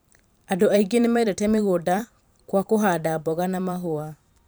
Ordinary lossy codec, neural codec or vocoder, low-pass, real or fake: none; vocoder, 44.1 kHz, 128 mel bands every 512 samples, BigVGAN v2; none; fake